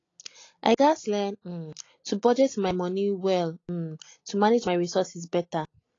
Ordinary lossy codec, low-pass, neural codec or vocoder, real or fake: AAC, 32 kbps; 7.2 kHz; none; real